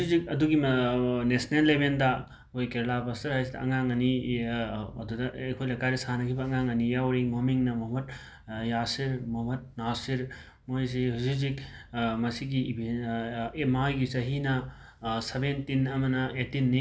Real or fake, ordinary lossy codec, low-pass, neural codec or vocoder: real; none; none; none